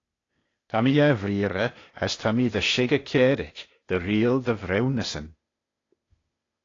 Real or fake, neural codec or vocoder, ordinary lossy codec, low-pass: fake; codec, 16 kHz, 0.8 kbps, ZipCodec; AAC, 32 kbps; 7.2 kHz